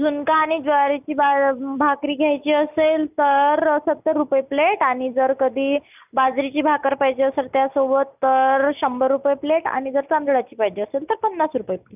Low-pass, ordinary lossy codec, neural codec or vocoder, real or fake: 3.6 kHz; none; none; real